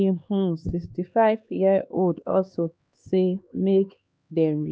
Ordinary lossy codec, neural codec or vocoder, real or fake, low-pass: none; codec, 16 kHz, 2 kbps, X-Codec, HuBERT features, trained on LibriSpeech; fake; none